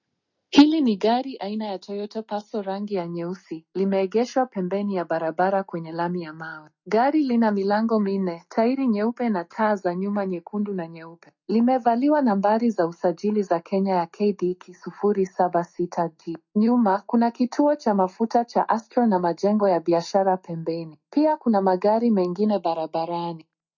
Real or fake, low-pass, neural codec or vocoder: real; 7.2 kHz; none